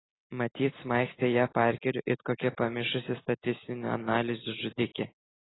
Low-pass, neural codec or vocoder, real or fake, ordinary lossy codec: 7.2 kHz; none; real; AAC, 16 kbps